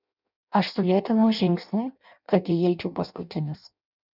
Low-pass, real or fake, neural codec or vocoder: 5.4 kHz; fake; codec, 16 kHz in and 24 kHz out, 0.6 kbps, FireRedTTS-2 codec